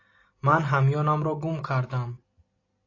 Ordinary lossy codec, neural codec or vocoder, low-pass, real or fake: AAC, 32 kbps; none; 7.2 kHz; real